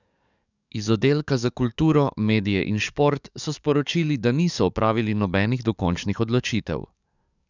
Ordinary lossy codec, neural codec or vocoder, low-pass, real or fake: none; codec, 44.1 kHz, 7.8 kbps, DAC; 7.2 kHz; fake